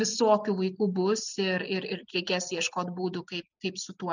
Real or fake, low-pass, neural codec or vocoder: real; 7.2 kHz; none